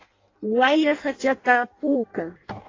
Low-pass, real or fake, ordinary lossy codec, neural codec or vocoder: 7.2 kHz; fake; AAC, 32 kbps; codec, 16 kHz in and 24 kHz out, 0.6 kbps, FireRedTTS-2 codec